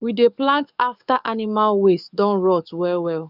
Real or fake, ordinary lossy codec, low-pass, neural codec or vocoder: fake; none; 5.4 kHz; codec, 44.1 kHz, 7.8 kbps, DAC